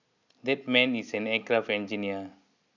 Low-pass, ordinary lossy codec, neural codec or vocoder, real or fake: 7.2 kHz; none; none; real